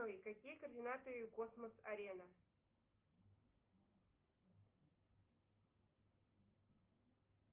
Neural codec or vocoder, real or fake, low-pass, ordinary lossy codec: none; real; 3.6 kHz; Opus, 32 kbps